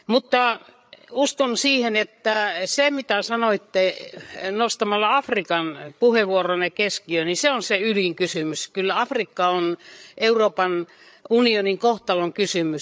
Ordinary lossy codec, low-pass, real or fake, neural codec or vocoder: none; none; fake; codec, 16 kHz, 8 kbps, FreqCodec, larger model